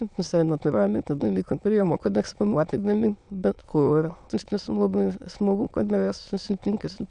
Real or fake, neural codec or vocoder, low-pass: fake; autoencoder, 22.05 kHz, a latent of 192 numbers a frame, VITS, trained on many speakers; 9.9 kHz